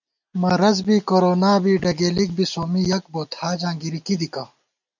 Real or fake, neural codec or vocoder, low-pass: real; none; 7.2 kHz